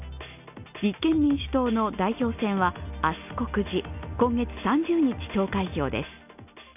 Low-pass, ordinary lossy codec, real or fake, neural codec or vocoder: 3.6 kHz; none; real; none